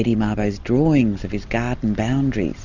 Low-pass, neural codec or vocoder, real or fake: 7.2 kHz; none; real